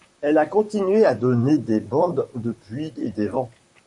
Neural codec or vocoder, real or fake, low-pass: vocoder, 44.1 kHz, 128 mel bands, Pupu-Vocoder; fake; 10.8 kHz